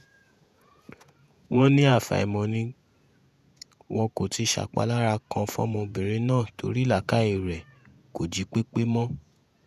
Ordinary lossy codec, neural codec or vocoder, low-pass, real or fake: none; vocoder, 48 kHz, 128 mel bands, Vocos; 14.4 kHz; fake